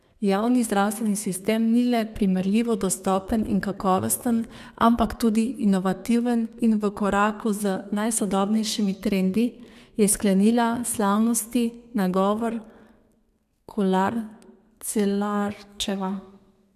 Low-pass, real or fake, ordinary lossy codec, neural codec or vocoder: 14.4 kHz; fake; none; codec, 32 kHz, 1.9 kbps, SNAC